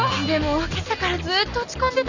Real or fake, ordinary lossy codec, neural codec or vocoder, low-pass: real; none; none; 7.2 kHz